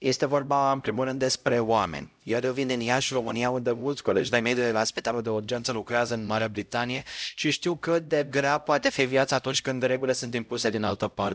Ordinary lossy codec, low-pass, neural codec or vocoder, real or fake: none; none; codec, 16 kHz, 0.5 kbps, X-Codec, HuBERT features, trained on LibriSpeech; fake